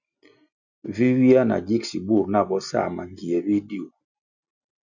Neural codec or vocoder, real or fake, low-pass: none; real; 7.2 kHz